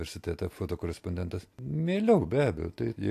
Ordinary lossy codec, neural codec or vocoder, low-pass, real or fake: AAC, 64 kbps; none; 14.4 kHz; real